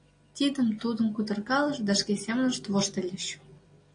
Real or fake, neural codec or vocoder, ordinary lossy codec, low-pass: real; none; AAC, 48 kbps; 9.9 kHz